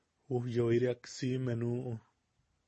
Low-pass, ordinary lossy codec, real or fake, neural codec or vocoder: 10.8 kHz; MP3, 32 kbps; real; none